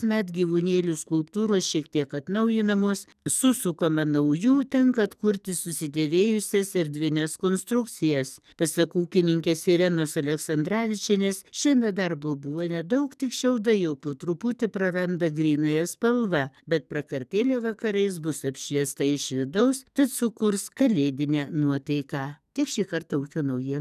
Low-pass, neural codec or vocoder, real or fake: 14.4 kHz; codec, 44.1 kHz, 2.6 kbps, SNAC; fake